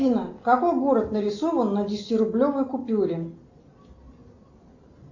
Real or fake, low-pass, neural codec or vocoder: real; 7.2 kHz; none